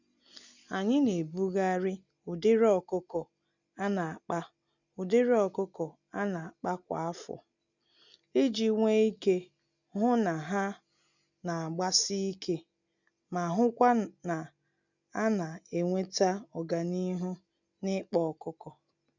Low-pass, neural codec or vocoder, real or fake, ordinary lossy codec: 7.2 kHz; none; real; none